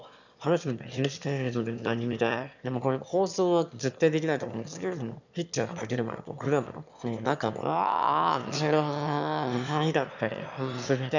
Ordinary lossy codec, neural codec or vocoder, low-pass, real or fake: none; autoencoder, 22.05 kHz, a latent of 192 numbers a frame, VITS, trained on one speaker; 7.2 kHz; fake